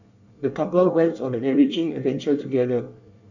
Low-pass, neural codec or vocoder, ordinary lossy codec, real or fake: 7.2 kHz; codec, 24 kHz, 1 kbps, SNAC; none; fake